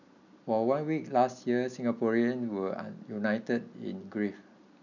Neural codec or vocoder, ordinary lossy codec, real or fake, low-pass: none; none; real; 7.2 kHz